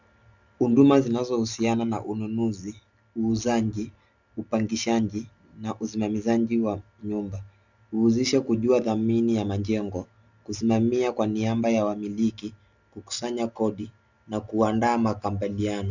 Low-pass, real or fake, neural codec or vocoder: 7.2 kHz; real; none